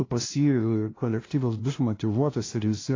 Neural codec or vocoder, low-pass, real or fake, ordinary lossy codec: codec, 16 kHz, 0.5 kbps, FunCodec, trained on LibriTTS, 25 frames a second; 7.2 kHz; fake; AAC, 32 kbps